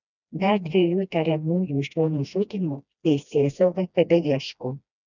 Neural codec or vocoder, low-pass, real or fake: codec, 16 kHz, 1 kbps, FreqCodec, smaller model; 7.2 kHz; fake